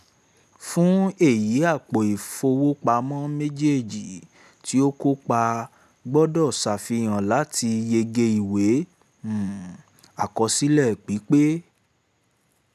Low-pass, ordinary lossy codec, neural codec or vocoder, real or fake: 14.4 kHz; none; none; real